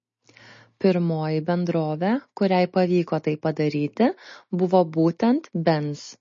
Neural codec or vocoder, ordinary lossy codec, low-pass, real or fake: none; MP3, 32 kbps; 7.2 kHz; real